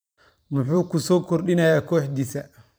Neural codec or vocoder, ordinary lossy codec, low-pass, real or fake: vocoder, 44.1 kHz, 128 mel bands every 512 samples, BigVGAN v2; none; none; fake